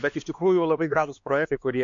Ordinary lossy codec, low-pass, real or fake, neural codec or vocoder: MP3, 48 kbps; 7.2 kHz; fake; codec, 16 kHz, 2 kbps, X-Codec, HuBERT features, trained on LibriSpeech